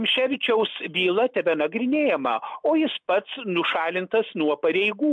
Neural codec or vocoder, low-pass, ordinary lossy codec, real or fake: none; 9.9 kHz; MP3, 64 kbps; real